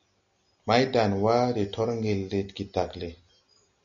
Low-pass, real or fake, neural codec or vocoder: 7.2 kHz; real; none